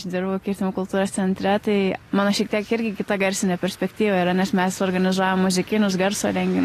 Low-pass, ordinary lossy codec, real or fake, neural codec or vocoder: 14.4 kHz; AAC, 48 kbps; real; none